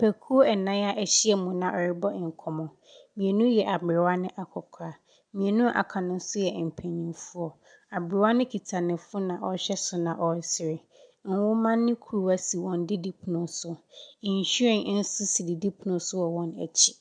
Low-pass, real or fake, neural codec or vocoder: 9.9 kHz; real; none